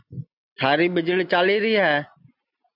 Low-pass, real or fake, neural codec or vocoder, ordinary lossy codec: 5.4 kHz; real; none; AAC, 48 kbps